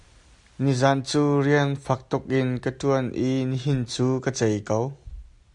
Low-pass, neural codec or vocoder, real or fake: 10.8 kHz; none; real